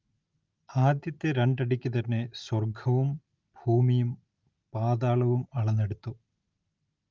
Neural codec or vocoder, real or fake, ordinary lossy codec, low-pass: none; real; Opus, 32 kbps; 7.2 kHz